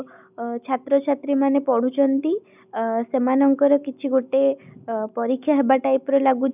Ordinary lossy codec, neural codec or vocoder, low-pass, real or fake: none; none; 3.6 kHz; real